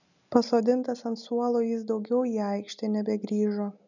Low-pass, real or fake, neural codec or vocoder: 7.2 kHz; real; none